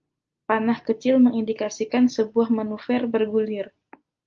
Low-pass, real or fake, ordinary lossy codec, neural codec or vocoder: 7.2 kHz; real; Opus, 24 kbps; none